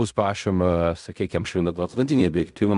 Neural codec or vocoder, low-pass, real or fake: codec, 16 kHz in and 24 kHz out, 0.4 kbps, LongCat-Audio-Codec, fine tuned four codebook decoder; 10.8 kHz; fake